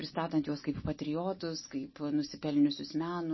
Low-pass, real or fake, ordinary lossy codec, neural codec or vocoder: 7.2 kHz; real; MP3, 24 kbps; none